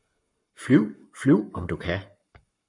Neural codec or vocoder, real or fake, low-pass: codec, 44.1 kHz, 7.8 kbps, Pupu-Codec; fake; 10.8 kHz